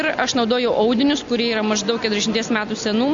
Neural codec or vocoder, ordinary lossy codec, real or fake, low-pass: none; AAC, 64 kbps; real; 7.2 kHz